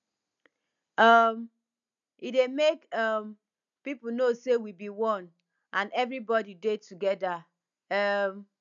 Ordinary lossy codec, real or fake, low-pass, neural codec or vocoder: none; real; 7.2 kHz; none